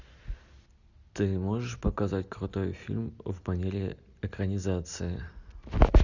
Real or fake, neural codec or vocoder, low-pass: real; none; 7.2 kHz